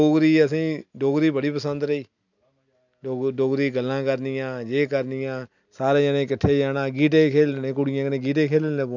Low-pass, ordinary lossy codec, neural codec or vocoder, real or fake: 7.2 kHz; none; none; real